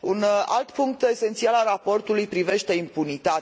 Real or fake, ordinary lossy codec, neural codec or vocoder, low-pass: real; none; none; none